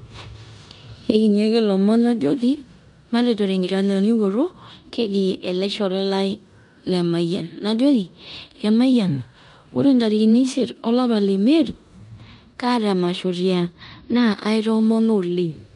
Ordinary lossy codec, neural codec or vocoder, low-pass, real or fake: none; codec, 16 kHz in and 24 kHz out, 0.9 kbps, LongCat-Audio-Codec, four codebook decoder; 10.8 kHz; fake